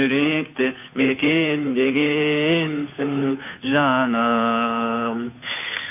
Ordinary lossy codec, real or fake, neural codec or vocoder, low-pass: none; fake; codec, 16 kHz, 2 kbps, FunCodec, trained on Chinese and English, 25 frames a second; 3.6 kHz